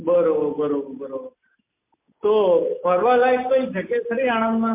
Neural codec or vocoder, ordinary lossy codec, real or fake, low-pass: none; MP3, 24 kbps; real; 3.6 kHz